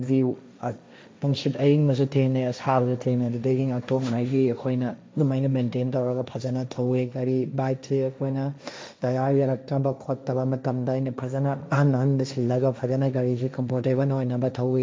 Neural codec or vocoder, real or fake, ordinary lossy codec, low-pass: codec, 16 kHz, 1.1 kbps, Voila-Tokenizer; fake; none; none